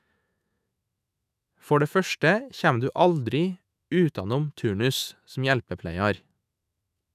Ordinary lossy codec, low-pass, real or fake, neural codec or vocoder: none; 14.4 kHz; fake; autoencoder, 48 kHz, 128 numbers a frame, DAC-VAE, trained on Japanese speech